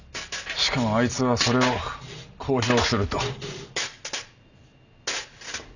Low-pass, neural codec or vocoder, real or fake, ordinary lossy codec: 7.2 kHz; none; real; none